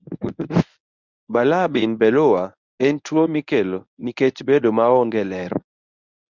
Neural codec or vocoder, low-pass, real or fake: codec, 24 kHz, 0.9 kbps, WavTokenizer, medium speech release version 1; 7.2 kHz; fake